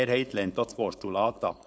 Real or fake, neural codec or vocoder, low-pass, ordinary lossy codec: fake; codec, 16 kHz, 4.8 kbps, FACodec; none; none